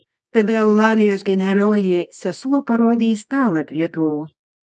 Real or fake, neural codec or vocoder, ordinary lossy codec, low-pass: fake; codec, 24 kHz, 0.9 kbps, WavTokenizer, medium music audio release; MP3, 96 kbps; 10.8 kHz